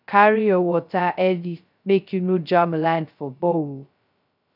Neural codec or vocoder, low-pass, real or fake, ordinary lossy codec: codec, 16 kHz, 0.2 kbps, FocalCodec; 5.4 kHz; fake; none